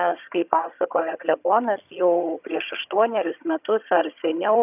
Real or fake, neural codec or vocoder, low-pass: fake; vocoder, 22.05 kHz, 80 mel bands, HiFi-GAN; 3.6 kHz